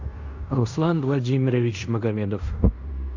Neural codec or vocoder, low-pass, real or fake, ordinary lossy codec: codec, 16 kHz in and 24 kHz out, 0.9 kbps, LongCat-Audio-Codec, fine tuned four codebook decoder; 7.2 kHz; fake; AAC, 48 kbps